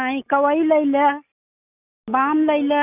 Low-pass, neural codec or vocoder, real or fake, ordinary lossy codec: 3.6 kHz; none; real; none